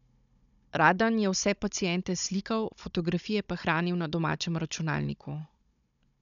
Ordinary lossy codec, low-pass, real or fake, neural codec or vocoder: MP3, 96 kbps; 7.2 kHz; fake; codec, 16 kHz, 16 kbps, FunCodec, trained on Chinese and English, 50 frames a second